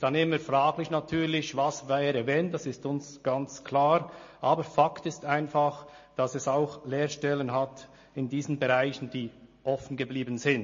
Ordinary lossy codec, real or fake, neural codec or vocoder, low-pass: MP3, 32 kbps; real; none; 7.2 kHz